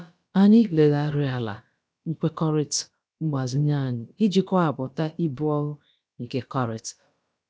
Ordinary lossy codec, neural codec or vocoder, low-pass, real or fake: none; codec, 16 kHz, about 1 kbps, DyCAST, with the encoder's durations; none; fake